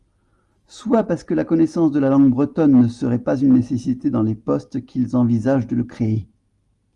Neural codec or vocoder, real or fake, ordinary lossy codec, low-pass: none; real; Opus, 32 kbps; 10.8 kHz